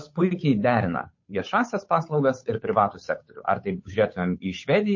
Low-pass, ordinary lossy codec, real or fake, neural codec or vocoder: 7.2 kHz; MP3, 32 kbps; fake; codec, 16 kHz, 8 kbps, FunCodec, trained on Chinese and English, 25 frames a second